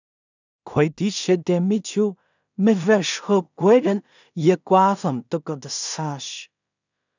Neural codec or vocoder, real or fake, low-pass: codec, 16 kHz in and 24 kHz out, 0.4 kbps, LongCat-Audio-Codec, two codebook decoder; fake; 7.2 kHz